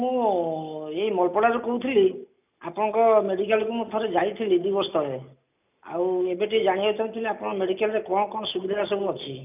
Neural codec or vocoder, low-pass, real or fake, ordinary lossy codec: none; 3.6 kHz; real; none